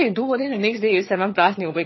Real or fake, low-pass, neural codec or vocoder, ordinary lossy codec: fake; 7.2 kHz; vocoder, 22.05 kHz, 80 mel bands, HiFi-GAN; MP3, 24 kbps